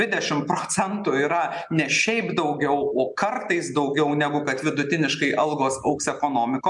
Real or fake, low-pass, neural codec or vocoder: real; 10.8 kHz; none